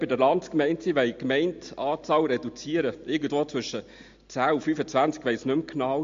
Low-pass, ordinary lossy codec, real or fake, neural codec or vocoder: 7.2 kHz; MP3, 48 kbps; real; none